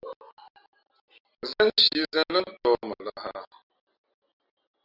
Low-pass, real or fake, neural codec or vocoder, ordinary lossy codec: 5.4 kHz; real; none; Opus, 64 kbps